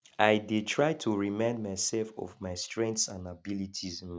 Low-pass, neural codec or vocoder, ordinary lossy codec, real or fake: none; none; none; real